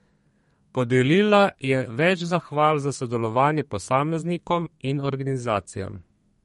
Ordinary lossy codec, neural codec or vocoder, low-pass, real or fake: MP3, 48 kbps; codec, 32 kHz, 1.9 kbps, SNAC; 14.4 kHz; fake